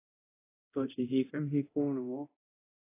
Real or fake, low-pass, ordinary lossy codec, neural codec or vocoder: fake; 3.6 kHz; AAC, 24 kbps; codec, 24 kHz, 0.9 kbps, DualCodec